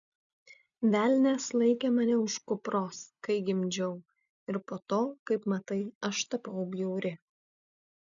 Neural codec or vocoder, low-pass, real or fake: none; 7.2 kHz; real